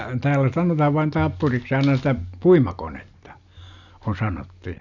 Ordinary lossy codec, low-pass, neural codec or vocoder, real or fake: none; 7.2 kHz; none; real